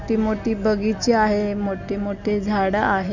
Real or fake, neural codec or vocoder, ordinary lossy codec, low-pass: real; none; none; 7.2 kHz